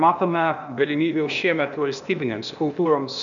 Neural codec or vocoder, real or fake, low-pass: codec, 16 kHz, 0.8 kbps, ZipCodec; fake; 7.2 kHz